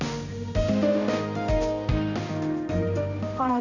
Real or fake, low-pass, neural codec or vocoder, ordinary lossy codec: fake; 7.2 kHz; codec, 16 kHz, 1 kbps, X-Codec, HuBERT features, trained on balanced general audio; none